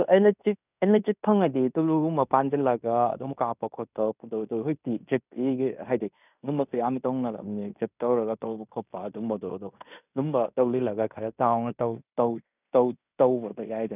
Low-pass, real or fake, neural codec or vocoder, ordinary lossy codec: 3.6 kHz; fake; codec, 16 kHz in and 24 kHz out, 0.9 kbps, LongCat-Audio-Codec, fine tuned four codebook decoder; none